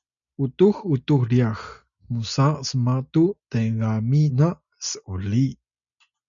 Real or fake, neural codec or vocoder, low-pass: real; none; 7.2 kHz